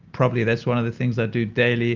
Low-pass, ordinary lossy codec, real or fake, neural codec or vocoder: 7.2 kHz; Opus, 32 kbps; real; none